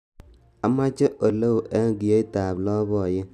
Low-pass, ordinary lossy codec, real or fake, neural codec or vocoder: 14.4 kHz; none; real; none